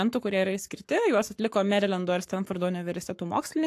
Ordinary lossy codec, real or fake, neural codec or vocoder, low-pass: AAC, 64 kbps; fake; codec, 44.1 kHz, 7.8 kbps, Pupu-Codec; 14.4 kHz